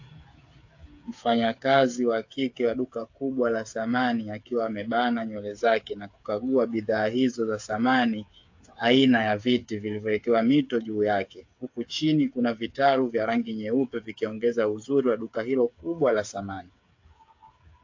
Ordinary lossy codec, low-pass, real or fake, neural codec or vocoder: AAC, 48 kbps; 7.2 kHz; fake; codec, 16 kHz, 8 kbps, FreqCodec, smaller model